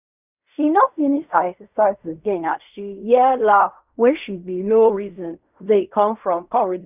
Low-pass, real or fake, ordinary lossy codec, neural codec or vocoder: 3.6 kHz; fake; none; codec, 16 kHz in and 24 kHz out, 0.4 kbps, LongCat-Audio-Codec, fine tuned four codebook decoder